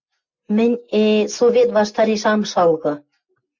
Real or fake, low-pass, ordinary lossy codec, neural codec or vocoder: real; 7.2 kHz; MP3, 64 kbps; none